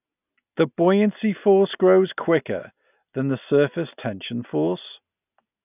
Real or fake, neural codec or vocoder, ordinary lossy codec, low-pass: real; none; none; 3.6 kHz